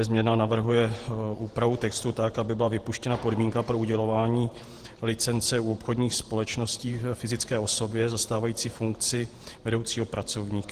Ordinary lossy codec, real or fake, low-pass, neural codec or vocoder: Opus, 16 kbps; fake; 14.4 kHz; vocoder, 48 kHz, 128 mel bands, Vocos